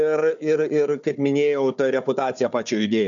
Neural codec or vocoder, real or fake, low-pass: codec, 16 kHz, 4 kbps, FunCodec, trained on Chinese and English, 50 frames a second; fake; 7.2 kHz